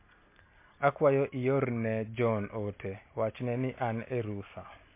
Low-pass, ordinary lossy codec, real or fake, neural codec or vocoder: 3.6 kHz; MP3, 24 kbps; real; none